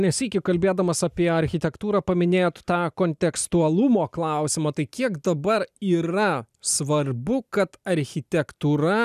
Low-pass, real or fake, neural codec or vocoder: 14.4 kHz; real; none